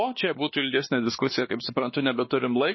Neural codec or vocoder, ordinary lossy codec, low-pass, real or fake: codec, 16 kHz, 2 kbps, X-Codec, WavLM features, trained on Multilingual LibriSpeech; MP3, 24 kbps; 7.2 kHz; fake